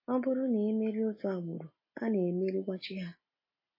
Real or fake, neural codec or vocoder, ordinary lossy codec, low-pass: real; none; MP3, 24 kbps; 5.4 kHz